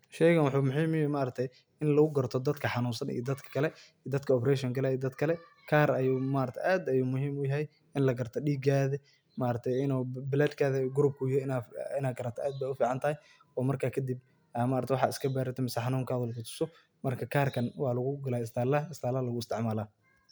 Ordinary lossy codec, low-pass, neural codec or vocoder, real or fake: none; none; none; real